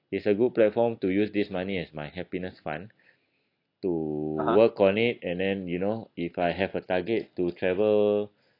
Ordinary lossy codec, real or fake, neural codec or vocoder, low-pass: AAC, 32 kbps; real; none; 5.4 kHz